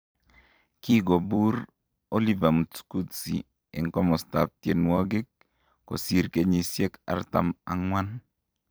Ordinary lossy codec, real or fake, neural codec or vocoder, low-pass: none; fake; vocoder, 44.1 kHz, 128 mel bands every 256 samples, BigVGAN v2; none